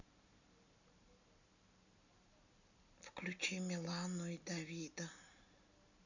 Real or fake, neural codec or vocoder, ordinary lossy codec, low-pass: real; none; none; 7.2 kHz